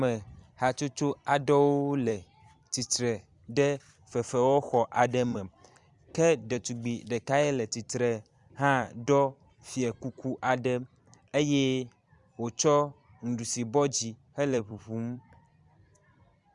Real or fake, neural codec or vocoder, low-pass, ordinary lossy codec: fake; vocoder, 44.1 kHz, 128 mel bands every 256 samples, BigVGAN v2; 10.8 kHz; Opus, 64 kbps